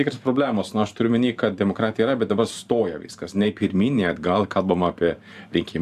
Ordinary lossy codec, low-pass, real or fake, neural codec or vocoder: AAC, 96 kbps; 14.4 kHz; fake; vocoder, 44.1 kHz, 128 mel bands every 512 samples, BigVGAN v2